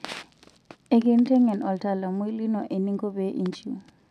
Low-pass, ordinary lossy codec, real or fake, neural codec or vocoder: 14.4 kHz; none; real; none